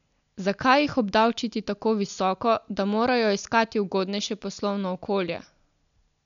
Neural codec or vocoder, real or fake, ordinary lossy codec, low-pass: none; real; MP3, 96 kbps; 7.2 kHz